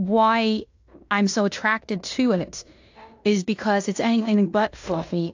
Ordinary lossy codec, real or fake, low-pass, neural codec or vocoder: AAC, 48 kbps; fake; 7.2 kHz; codec, 16 kHz in and 24 kHz out, 0.9 kbps, LongCat-Audio-Codec, fine tuned four codebook decoder